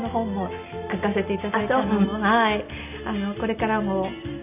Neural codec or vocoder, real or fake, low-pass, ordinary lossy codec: none; real; 3.6 kHz; none